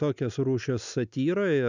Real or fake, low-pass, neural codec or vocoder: real; 7.2 kHz; none